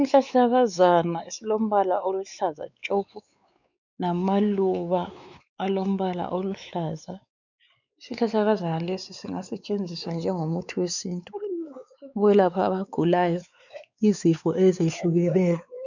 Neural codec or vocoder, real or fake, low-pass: codec, 16 kHz, 4 kbps, X-Codec, WavLM features, trained on Multilingual LibriSpeech; fake; 7.2 kHz